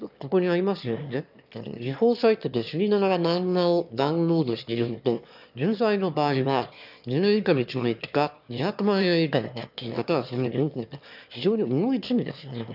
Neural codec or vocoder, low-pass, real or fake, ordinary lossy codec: autoencoder, 22.05 kHz, a latent of 192 numbers a frame, VITS, trained on one speaker; 5.4 kHz; fake; none